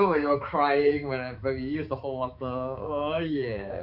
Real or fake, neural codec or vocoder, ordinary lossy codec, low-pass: fake; codec, 16 kHz, 4 kbps, X-Codec, HuBERT features, trained on balanced general audio; none; 5.4 kHz